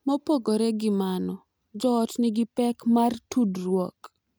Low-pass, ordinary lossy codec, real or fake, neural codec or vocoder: none; none; real; none